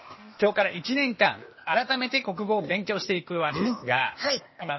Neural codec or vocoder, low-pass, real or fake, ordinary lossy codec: codec, 16 kHz, 0.8 kbps, ZipCodec; 7.2 kHz; fake; MP3, 24 kbps